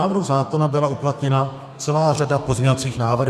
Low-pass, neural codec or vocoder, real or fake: 14.4 kHz; codec, 32 kHz, 1.9 kbps, SNAC; fake